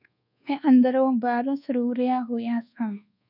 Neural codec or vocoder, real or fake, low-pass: codec, 24 kHz, 1.2 kbps, DualCodec; fake; 5.4 kHz